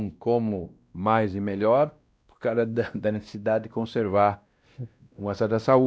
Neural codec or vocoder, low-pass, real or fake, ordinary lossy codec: codec, 16 kHz, 1 kbps, X-Codec, WavLM features, trained on Multilingual LibriSpeech; none; fake; none